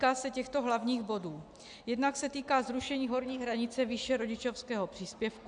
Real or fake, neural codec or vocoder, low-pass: real; none; 9.9 kHz